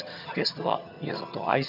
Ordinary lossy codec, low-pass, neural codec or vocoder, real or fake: none; 5.4 kHz; vocoder, 22.05 kHz, 80 mel bands, HiFi-GAN; fake